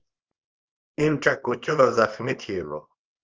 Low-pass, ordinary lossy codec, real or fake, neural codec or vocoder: 7.2 kHz; Opus, 24 kbps; fake; codec, 24 kHz, 0.9 kbps, WavTokenizer, small release